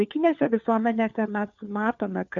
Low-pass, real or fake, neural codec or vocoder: 7.2 kHz; fake; codec, 16 kHz, 4 kbps, FunCodec, trained on LibriTTS, 50 frames a second